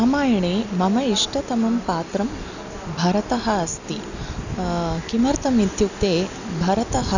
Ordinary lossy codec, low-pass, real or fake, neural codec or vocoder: none; 7.2 kHz; real; none